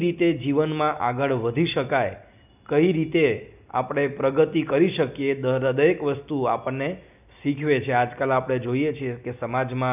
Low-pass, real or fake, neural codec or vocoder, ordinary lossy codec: 3.6 kHz; real; none; none